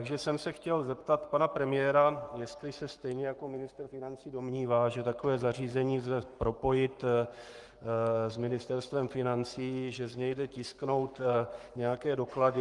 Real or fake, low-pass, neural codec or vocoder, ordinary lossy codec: fake; 10.8 kHz; codec, 44.1 kHz, 7.8 kbps, Pupu-Codec; Opus, 32 kbps